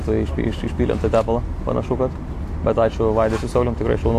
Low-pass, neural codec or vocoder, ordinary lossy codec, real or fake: 14.4 kHz; none; AAC, 64 kbps; real